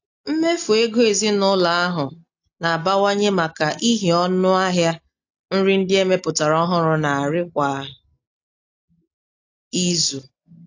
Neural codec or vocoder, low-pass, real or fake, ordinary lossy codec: none; 7.2 kHz; real; AAC, 48 kbps